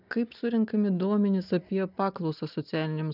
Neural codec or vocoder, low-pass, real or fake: none; 5.4 kHz; real